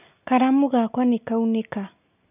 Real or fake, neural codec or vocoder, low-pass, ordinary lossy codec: real; none; 3.6 kHz; none